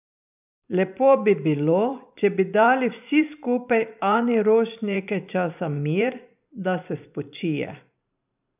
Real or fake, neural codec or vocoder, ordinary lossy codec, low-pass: fake; vocoder, 44.1 kHz, 128 mel bands every 512 samples, BigVGAN v2; none; 3.6 kHz